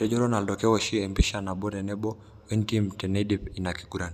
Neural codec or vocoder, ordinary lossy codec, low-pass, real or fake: none; none; 14.4 kHz; real